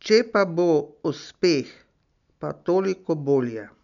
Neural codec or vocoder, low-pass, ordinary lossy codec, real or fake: none; 7.2 kHz; none; real